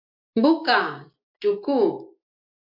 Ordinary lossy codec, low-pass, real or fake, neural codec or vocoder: MP3, 48 kbps; 5.4 kHz; real; none